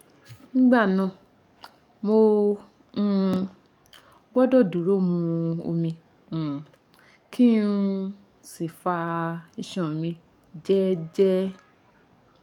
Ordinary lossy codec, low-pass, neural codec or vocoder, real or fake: MP3, 96 kbps; 19.8 kHz; codec, 44.1 kHz, 7.8 kbps, DAC; fake